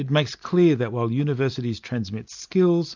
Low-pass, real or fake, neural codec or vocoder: 7.2 kHz; real; none